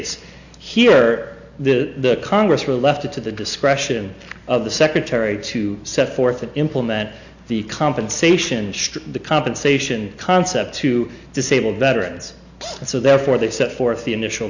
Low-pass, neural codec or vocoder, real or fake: 7.2 kHz; none; real